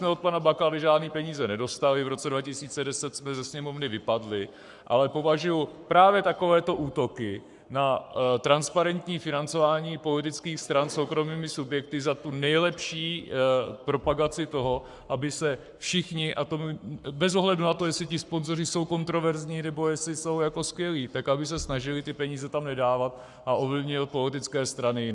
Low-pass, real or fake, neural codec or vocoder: 10.8 kHz; fake; codec, 44.1 kHz, 7.8 kbps, Pupu-Codec